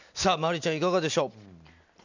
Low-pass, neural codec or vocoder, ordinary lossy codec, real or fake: 7.2 kHz; none; none; real